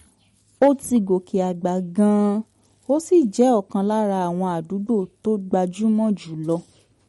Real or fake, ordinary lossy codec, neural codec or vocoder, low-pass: real; MP3, 48 kbps; none; 19.8 kHz